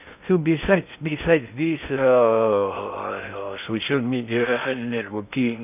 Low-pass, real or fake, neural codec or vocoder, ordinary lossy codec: 3.6 kHz; fake; codec, 16 kHz in and 24 kHz out, 0.6 kbps, FocalCodec, streaming, 2048 codes; none